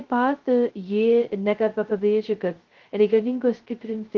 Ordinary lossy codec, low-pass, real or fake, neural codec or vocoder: Opus, 16 kbps; 7.2 kHz; fake; codec, 16 kHz, 0.2 kbps, FocalCodec